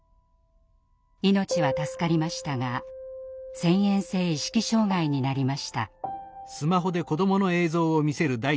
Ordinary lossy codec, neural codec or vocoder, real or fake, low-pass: none; none; real; none